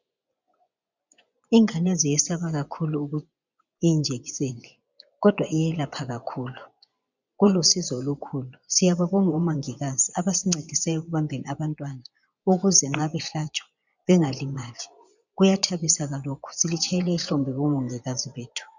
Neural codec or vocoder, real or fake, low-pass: vocoder, 24 kHz, 100 mel bands, Vocos; fake; 7.2 kHz